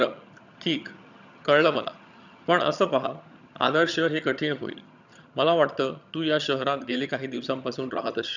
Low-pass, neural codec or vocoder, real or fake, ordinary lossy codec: 7.2 kHz; vocoder, 22.05 kHz, 80 mel bands, HiFi-GAN; fake; none